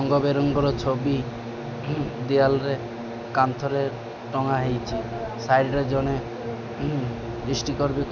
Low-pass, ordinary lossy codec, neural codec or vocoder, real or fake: none; none; none; real